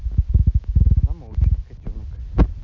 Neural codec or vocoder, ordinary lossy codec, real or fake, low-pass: none; none; real; 7.2 kHz